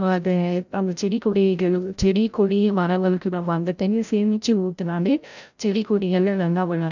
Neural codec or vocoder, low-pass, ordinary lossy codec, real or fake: codec, 16 kHz, 0.5 kbps, FreqCodec, larger model; 7.2 kHz; none; fake